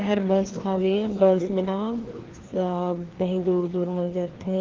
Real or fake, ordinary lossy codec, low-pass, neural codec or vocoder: fake; Opus, 16 kbps; 7.2 kHz; codec, 16 kHz, 2 kbps, FreqCodec, larger model